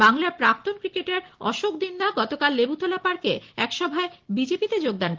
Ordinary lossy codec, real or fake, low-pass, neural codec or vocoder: Opus, 16 kbps; real; 7.2 kHz; none